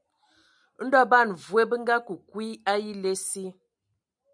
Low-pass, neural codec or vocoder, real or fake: 9.9 kHz; none; real